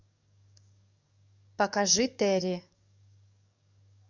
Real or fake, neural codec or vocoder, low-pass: fake; codec, 44.1 kHz, 7.8 kbps, DAC; 7.2 kHz